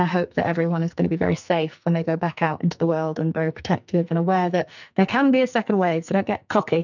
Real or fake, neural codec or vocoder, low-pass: fake; codec, 44.1 kHz, 2.6 kbps, SNAC; 7.2 kHz